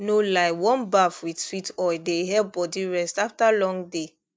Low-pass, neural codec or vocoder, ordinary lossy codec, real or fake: none; none; none; real